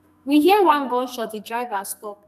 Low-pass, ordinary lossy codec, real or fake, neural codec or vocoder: 14.4 kHz; none; fake; codec, 44.1 kHz, 2.6 kbps, SNAC